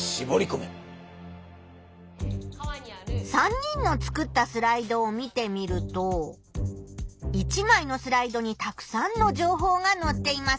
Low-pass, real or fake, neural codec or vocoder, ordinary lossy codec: none; real; none; none